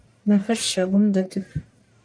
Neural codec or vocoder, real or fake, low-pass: codec, 44.1 kHz, 1.7 kbps, Pupu-Codec; fake; 9.9 kHz